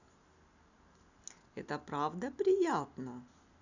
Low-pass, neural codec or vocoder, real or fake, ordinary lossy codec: 7.2 kHz; none; real; none